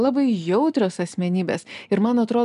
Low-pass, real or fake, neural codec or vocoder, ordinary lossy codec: 10.8 kHz; real; none; MP3, 96 kbps